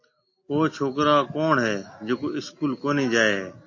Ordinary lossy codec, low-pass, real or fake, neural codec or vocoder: MP3, 32 kbps; 7.2 kHz; real; none